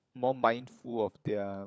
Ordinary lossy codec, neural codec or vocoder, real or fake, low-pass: none; codec, 16 kHz, 16 kbps, FunCodec, trained on LibriTTS, 50 frames a second; fake; none